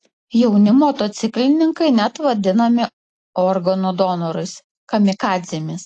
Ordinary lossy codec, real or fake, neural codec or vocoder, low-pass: AAC, 48 kbps; real; none; 10.8 kHz